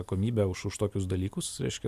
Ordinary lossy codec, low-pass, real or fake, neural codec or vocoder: MP3, 96 kbps; 14.4 kHz; real; none